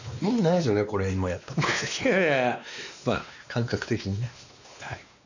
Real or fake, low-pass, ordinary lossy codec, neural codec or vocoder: fake; 7.2 kHz; none; codec, 16 kHz, 2 kbps, X-Codec, WavLM features, trained on Multilingual LibriSpeech